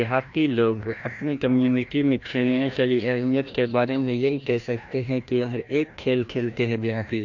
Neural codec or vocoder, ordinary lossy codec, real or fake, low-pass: codec, 16 kHz, 1 kbps, FreqCodec, larger model; AAC, 48 kbps; fake; 7.2 kHz